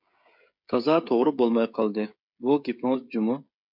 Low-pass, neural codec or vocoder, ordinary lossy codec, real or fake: 5.4 kHz; codec, 16 kHz, 16 kbps, FreqCodec, smaller model; MP3, 48 kbps; fake